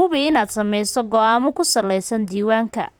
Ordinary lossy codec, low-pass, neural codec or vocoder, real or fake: none; none; vocoder, 44.1 kHz, 128 mel bands, Pupu-Vocoder; fake